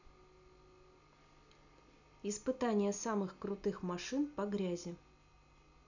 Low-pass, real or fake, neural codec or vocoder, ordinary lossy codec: 7.2 kHz; real; none; none